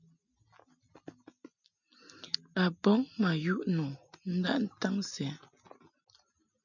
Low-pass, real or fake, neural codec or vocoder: 7.2 kHz; real; none